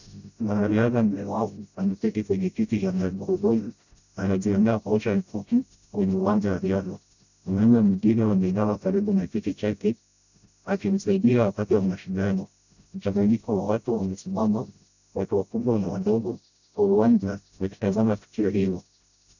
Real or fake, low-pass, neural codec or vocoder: fake; 7.2 kHz; codec, 16 kHz, 0.5 kbps, FreqCodec, smaller model